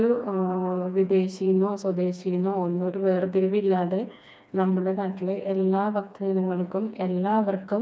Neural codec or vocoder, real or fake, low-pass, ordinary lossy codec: codec, 16 kHz, 2 kbps, FreqCodec, smaller model; fake; none; none